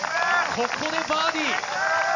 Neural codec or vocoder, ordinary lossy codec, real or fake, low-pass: none; none; real; 7.2 kHz